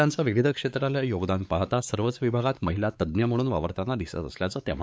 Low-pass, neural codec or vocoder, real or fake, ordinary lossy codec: none; codec, 16 kHz, 4 kbps, X-Codec, WavLM features, trained on Multilingual LibriSpeech; fake; none